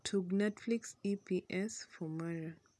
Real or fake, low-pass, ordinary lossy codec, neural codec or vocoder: real; none; none; none